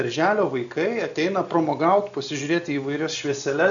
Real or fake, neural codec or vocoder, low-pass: real; none; 7.2 kHz